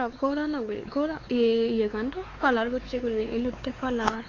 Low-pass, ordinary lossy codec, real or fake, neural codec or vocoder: 7.2 kHz; AAC, 32 kbps; fake; codec, 16 kHz, 4 kbps, X-Codec, WavLM features, trained on Multilingual LibriSpeech